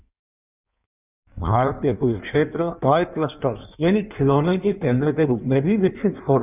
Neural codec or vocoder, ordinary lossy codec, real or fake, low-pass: codec, 16 kHz in and 24 kHz out, 1.1 kbps, FireRedTTS-2 codec; none; fake; 3.6 kHz